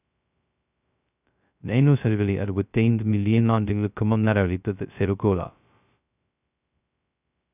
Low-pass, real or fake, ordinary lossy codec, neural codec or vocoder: 3.6 kHz; fake; none; codec, 16 kHz, 0.2 kbps, FocalCodec